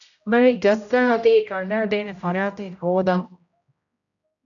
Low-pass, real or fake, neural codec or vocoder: 7.2 kHz; fake; codec, 16 kHz, 0.5 kbps, X-Codec, HuBERT features, trained on general audio